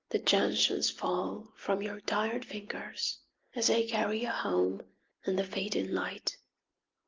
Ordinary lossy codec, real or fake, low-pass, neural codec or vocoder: Opus, 24 kbps; real; 7.2 kHz; none